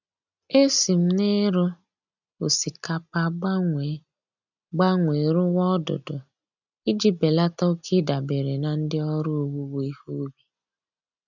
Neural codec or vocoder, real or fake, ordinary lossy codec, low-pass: none; real; none; 7.2 kHz